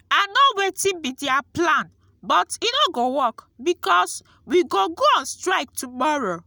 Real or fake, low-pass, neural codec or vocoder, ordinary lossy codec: real; none; none; none